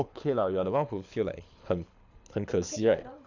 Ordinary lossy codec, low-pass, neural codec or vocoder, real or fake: none; 7.2 kHz; codec, 24 kHz, 6 kbps, HILCodec; fake